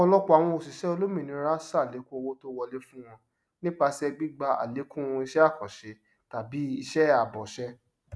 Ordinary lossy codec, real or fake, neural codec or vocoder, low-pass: none; real; none; none